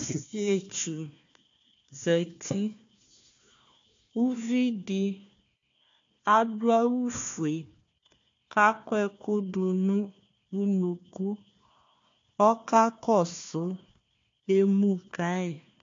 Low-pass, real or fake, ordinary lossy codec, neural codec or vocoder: 7.2 kHz; fake; AAC, 48 kbps; codec, 16 kHz, 1 kbps, FunCodec, trained on Chinese and English, 50 frames a second